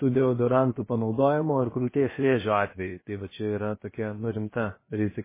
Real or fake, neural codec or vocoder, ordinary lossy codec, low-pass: fake; codec, 16 kHz, about 1 kbps, DyCAST, with the encoder's durations; MP3, 16 kbps; 3.6 kHz